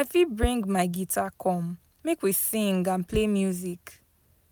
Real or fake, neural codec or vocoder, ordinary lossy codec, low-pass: real; none; none; none